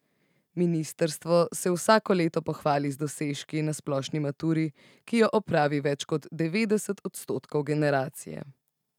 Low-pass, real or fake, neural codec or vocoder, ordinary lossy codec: 19.8 kHz; real; none; none